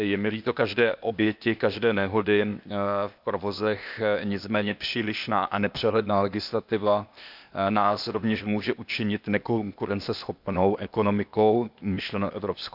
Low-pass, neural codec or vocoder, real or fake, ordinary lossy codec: 5.4 kHz; codec, 16 kHz, 0.8 kbps, ZipCodec; fake; none